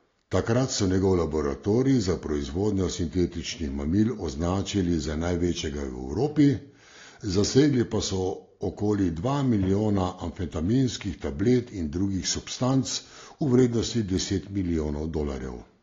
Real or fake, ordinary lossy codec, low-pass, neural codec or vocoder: real; AAC, 32 kbps; 7.2 kHz; none